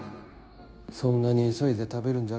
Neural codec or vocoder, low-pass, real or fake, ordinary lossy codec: codec, 16 kHz, 0.9 kbps, LongCat-Audio-Codec; none; fake; none